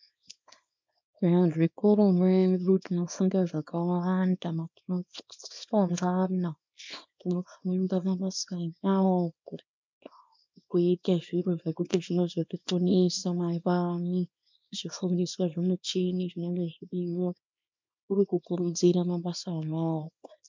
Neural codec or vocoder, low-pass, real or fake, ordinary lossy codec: codec, 24 kHz, 0.9 kbps, WavTokenizer, small release; 7.2 kHz; fake; MP3, 64 kbps